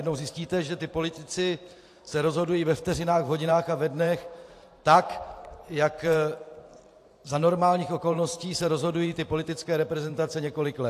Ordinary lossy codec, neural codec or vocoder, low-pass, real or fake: AAC, 64 kbps; vocoder, 44.1 kHz, 128 mel bands every 512 samples, BigVGAN v2; 14.4 kHz; fake